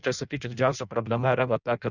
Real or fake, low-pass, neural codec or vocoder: fake; 7.2 kHz; codec, 16 kHz in and 24 kHz out, 0.6 kbps, FireRedTTS-2 codec